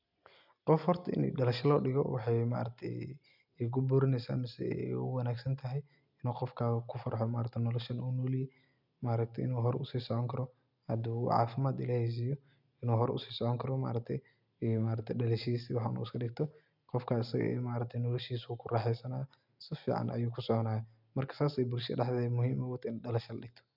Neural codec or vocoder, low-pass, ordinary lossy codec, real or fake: none; 5.4 kHz; none; real